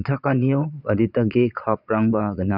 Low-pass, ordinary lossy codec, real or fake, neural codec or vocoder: 5.4 kHz; none; fake; vocoder, 22.05 kHz, 80 mel bands, Vocos